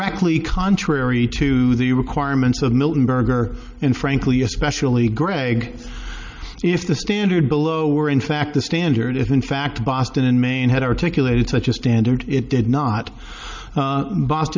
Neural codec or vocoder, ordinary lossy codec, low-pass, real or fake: none; MP3, 64 kbps; 7.2 kHz; real